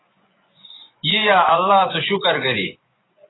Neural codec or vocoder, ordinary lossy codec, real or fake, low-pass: vocoder, 24 kHz, 100 mel bands, Vocos; AAC, 16 kbps; fake; 7.2 kHz